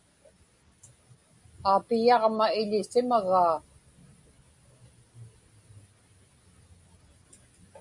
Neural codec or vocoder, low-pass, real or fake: none; 10.8 kHz; real